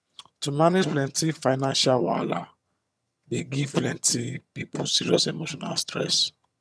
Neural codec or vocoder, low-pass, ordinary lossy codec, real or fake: vocoder, 22.05 kHz, 80 mel bands, HiFi-GAN; none; none; fake